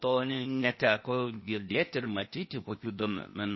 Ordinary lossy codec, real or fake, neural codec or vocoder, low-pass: MP3, 24 kbps; fake; codec, 16 kHz, 0.8 kbps, ZipCodec; 7.2 kHz